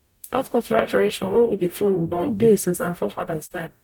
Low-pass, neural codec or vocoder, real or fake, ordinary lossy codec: 19.8 kHz; codec, 44.1 kHz, 0.9 kbps, DAC; fake; none